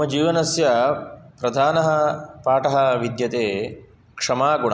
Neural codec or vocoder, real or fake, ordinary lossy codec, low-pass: none; real; none; none